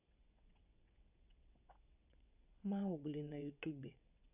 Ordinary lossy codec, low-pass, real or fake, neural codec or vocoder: none; 3.6 kHz; fake; vocoder, 22.05 kHz, 80 mel bands, WaveNeXt